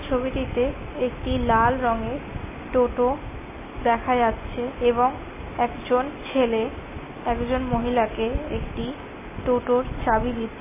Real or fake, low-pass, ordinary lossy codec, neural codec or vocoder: real; 3.6 kHz; MP3, 16 kbps; none